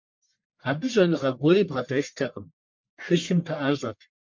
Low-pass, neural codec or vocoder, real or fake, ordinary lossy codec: 7.2 kHz; codec, 44.1 kHz, 1.7 kbps, Pupu-Codec; fake; MP3, 48 kbps